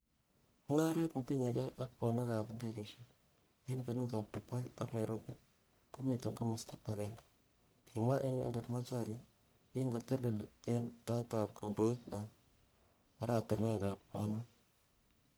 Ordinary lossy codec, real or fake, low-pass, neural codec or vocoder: none; fake; none; codec, 44.1 kHz, 1.7 kbps, Pupu-Codec